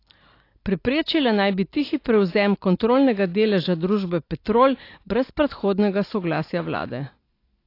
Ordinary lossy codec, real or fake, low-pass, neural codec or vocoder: AAC, 32 kbps; real; 5.4 kHz; none